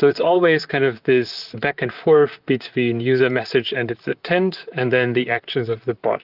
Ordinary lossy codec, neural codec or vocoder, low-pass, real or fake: Opus, 32 kbps; vocoder, 44.1 kHz, 128 mel bands, Pupu-Vocoder; 5.4 kHz; fake